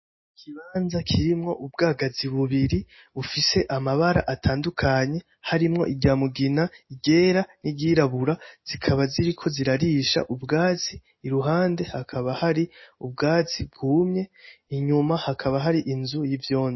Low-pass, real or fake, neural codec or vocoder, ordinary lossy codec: 7.2 kHz; real; none; MP3, 24 kbps